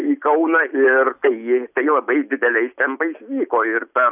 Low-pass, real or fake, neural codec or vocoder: 3.6 kHz; real; none